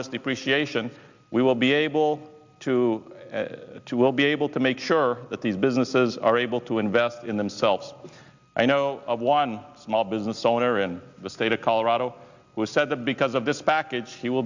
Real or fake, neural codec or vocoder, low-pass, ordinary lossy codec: real; none; 7.2 kHz; Opus, 64 kbps